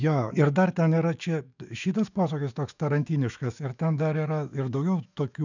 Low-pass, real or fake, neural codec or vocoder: 7.2 kHz; fake; autoencoder, 48 kHz, 128 numbers a frame, DAC-VAE, trained on Japanese speech